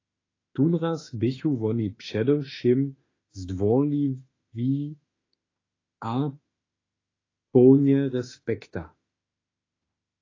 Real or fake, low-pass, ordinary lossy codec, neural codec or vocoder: fake; 7.2 kHz; AAC, 32 kbps; autoencoder, 48 kHz, 32 numbers a frame, DAC-VAE, trained on Japanese speech